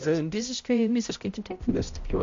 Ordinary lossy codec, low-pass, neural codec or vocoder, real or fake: MP3, 48 kbps; 7.2 kHz; codec, 16 kHz, 0.5 kbps, X-Codec, HuBERT features, trained on balanced general audio; fake